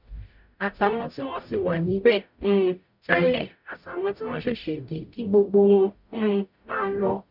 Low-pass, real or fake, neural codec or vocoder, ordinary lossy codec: 5.4 kHz; fake; codec, 44.1 kHz, 0.9 kbps, DAC; none